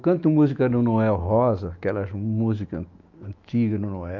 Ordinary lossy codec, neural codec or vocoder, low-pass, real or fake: Opus, 24 kbps; autoencoder, 48 kHz, 128 numbers a frame, DAC-VAE, trained on Japanese speech; 7.2 kHz; fake